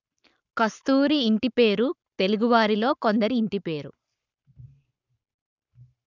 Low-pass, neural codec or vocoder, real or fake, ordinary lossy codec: 7.2 kHz; codec, 44.1 kHz, 7.8 kbps, Pupu-Codec; fake; none